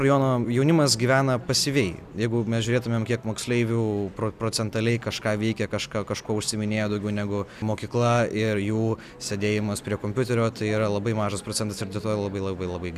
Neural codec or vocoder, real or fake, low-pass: none; real; 14.4 kHz